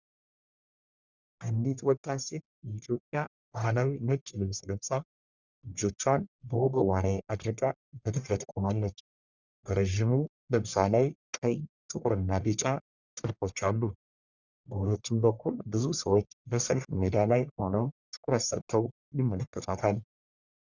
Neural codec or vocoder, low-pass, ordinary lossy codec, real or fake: codec, 44.1 kHz, 1.7 kbps, Pupu-Codec; 7.2 kHz; Opus, 64 kbps; fake